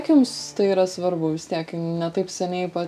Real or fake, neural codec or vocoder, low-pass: real; none; 14.4 kHz